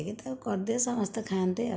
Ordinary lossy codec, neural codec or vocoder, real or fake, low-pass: none; none; real; none